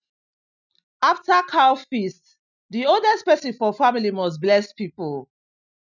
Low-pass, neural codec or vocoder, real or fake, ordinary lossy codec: 7.2 kHz; none; real; none